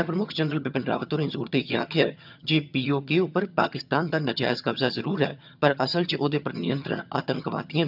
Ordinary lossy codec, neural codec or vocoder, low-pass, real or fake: none; vocoder, 22.05 kHz, 80 mel bands, HiFi-GAN; 5.4 kHz; fake